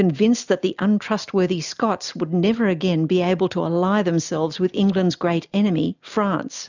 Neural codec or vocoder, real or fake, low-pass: none; real; 7.2 kHz